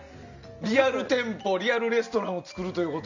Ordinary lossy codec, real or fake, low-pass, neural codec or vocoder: none; real; 7.2 kHz; none